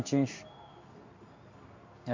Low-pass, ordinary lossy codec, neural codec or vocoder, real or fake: 7.2 kHz; MP3, 64 kbps; codec, 16 kHz in and 24 kHz out, 1 kbps, XY-Tokenizer; fake